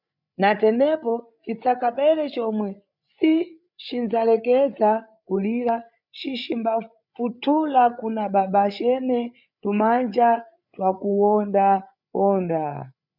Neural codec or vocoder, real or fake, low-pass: codec, 16 kHz, 8 kbps, FreqCodec, larger model; fake; 5.4 kHz